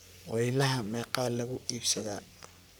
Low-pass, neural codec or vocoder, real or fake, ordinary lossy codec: none; codec, 44.1 kHz, 3.4 kbps, Pupu-Codec; fake; none